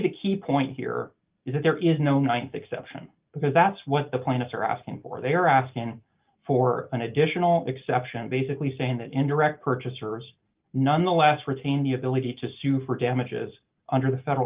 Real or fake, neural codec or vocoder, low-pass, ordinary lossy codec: real; none; 3.6 kHz; Opus, 24 kbps